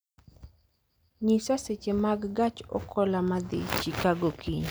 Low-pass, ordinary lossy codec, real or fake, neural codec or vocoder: none; none; real; none